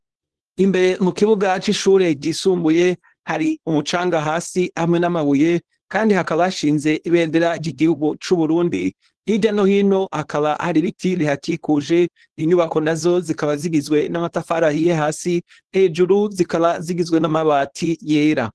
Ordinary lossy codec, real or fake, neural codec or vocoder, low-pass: Opus, 16 kbps; fake; codec, 24 kHz, 0.9 kbps, WavTokenizer, small release; 10.8 kHz